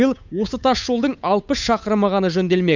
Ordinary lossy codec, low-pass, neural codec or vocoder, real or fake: none; 7.2 kHz; codec, 16 kHz, 8 kbps, FunCodec, trained on Chinese and English, 25 frames a second; fake